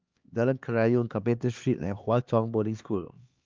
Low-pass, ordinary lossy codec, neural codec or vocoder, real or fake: 7.2 kHz; Opus, 24 kbps; codec, 16 kHz, 2 kbps, X-Codec, HuBERT features, trained on LibriSpeech; fake